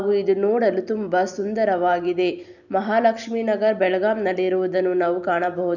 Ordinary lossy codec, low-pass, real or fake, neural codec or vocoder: none; 7.2 kHz; real; none